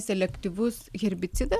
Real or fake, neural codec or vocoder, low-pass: real; none; 14.4 kHz